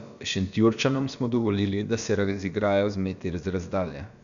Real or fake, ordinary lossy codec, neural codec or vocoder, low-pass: fake; none; codec, 16 kHz, about 1 kbps, DyCAST, with the encoder's durations; 7.2 kHz